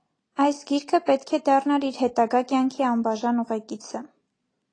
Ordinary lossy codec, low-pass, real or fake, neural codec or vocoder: AAC, 32 kbps; 9.9 kHz; real; none